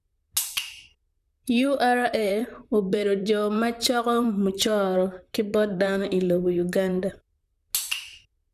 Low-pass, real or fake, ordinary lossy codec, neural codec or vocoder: 14.4 kHz; fake; none; vocoder, 44.1 kHz, 128 mel bands, Pupu-Vocoder